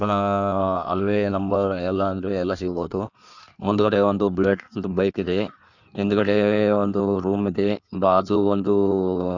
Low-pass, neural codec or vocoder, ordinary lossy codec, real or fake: 7.2 kHz; codec, 16 kHz in and 24 kHz out, 1.1 kbps, FireRedTTS-2 codec; none; fake